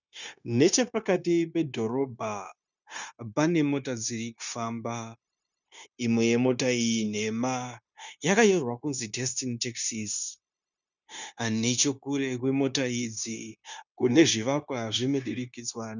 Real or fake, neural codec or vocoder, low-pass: fake; codec, 16 kHz, 0.9 kbps, LongCat-Audio-Codec; 7.2 kHz